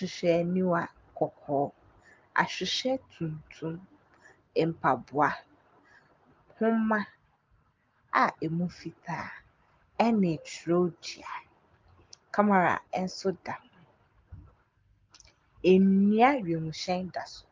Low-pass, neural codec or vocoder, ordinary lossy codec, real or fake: 7.2 kHz; none; Opus, 32 kbps; real